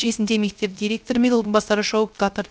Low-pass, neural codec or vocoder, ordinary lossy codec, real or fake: none; codec, 16 kHz, 0.3 kbps, FocalCodec; none; fake